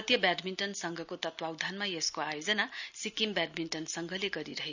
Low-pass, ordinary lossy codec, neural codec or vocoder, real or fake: 7.2 kHz; none; none; real